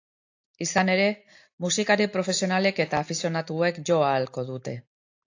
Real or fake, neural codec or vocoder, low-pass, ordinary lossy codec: real; none; 7.2 kHz; AAC, 48 kbps